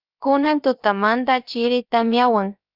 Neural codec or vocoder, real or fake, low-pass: codec, 16 kHz, about 1 kbps, DyCAST, with the encoder's durations; fake; 5.4 kHz